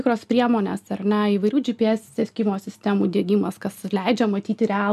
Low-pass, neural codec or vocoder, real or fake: 14.4 kHz; none; real